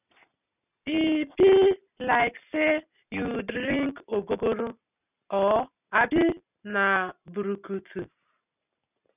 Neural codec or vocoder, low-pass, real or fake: none; 3.6 kHz; real